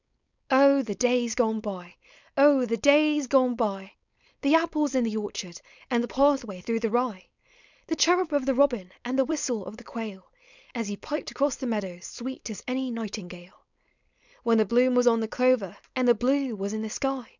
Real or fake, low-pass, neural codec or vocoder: fake; 7.2 kHz; codec, 16 kHz, 4.8 kbps, FACodec